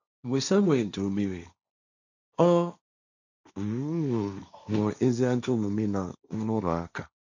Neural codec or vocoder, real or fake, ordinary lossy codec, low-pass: codec, 16 kHz, 1.1 kbps, Voila-Tokenizer; fake; none; 7.2 kHz